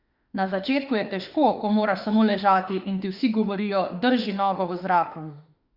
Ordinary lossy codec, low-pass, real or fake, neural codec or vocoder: Opus, 64 kbps; 5.4 kHz; fake; autoencoder, 48 kHz, 32 numbers a frame, DAC-VAE, trained on Japanese speech